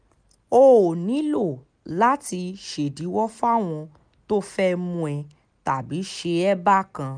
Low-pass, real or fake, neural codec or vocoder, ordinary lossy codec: 9.9 kHz; real; none; none